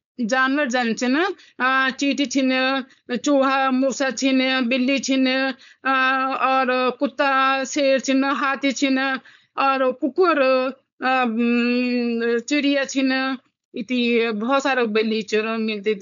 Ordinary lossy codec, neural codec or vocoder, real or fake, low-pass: none; codec, 16 kHz, 4.8 kbps, FACodec; fake; 7.2 kHz